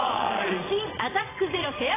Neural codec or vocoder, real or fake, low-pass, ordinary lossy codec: vocoder, 22.05 kHz, 80 mel bands, Vocos; fake; 3.6 kHz; AAC, 16 kbps